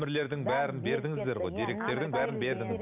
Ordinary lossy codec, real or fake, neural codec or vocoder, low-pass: none; real; none; 3.6 kHz